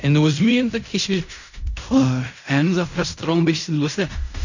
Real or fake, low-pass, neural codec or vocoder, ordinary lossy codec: fake; 7.2 kHz; codec, 16 kHz in and 24 kHz out, 0.4 kbps, LongCat-Audio-Codec, fine tuned four codebook decoder; none